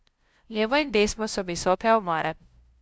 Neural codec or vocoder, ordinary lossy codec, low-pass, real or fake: codec, 16 kHz, 0.5 kbps, FunCodec, trained on LibriTTS, 25 frames a second; none; none; fake